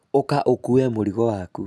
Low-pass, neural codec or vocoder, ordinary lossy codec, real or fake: none; none; none; real